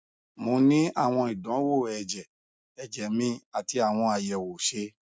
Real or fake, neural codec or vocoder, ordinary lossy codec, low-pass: real; none; none; none